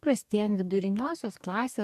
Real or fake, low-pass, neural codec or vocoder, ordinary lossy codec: fake; 14.4 kHz; codec, 44.1 kHz, 2.6 kbps, DAC; MP3, 96 kbps